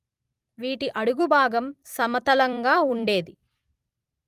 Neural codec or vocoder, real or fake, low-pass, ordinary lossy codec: vocoder, 44.1 kHz, 128 mel bands every 256 samples, BigVGAN v2; fake; 14.4 kHz; Opus, 32 kbps